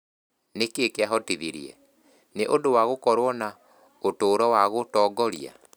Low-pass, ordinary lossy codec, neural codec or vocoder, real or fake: none; none; none; real